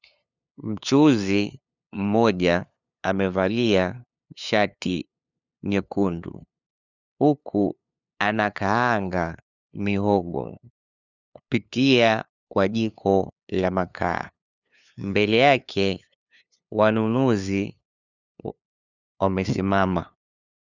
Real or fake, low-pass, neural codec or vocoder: fake; 7.2 kHz; codec, 16 kHz, 2 kbps, FunCodec, trained on LibriTTS, 25 frames a second